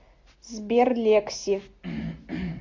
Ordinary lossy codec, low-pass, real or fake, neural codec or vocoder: MP3, 48 kbps; 7.2 kHz; real; none